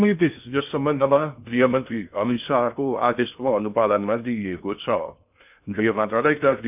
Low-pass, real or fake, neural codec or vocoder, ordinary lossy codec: 3.6 kHz; fake; codec, 16 kHz in and 24 kHz out, 0.6 kbps, FocalCodec, streaming, 2048 codes; none